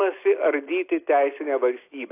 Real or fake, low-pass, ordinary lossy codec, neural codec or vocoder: real; 3.6 kHz; AAC, 24 kbps; none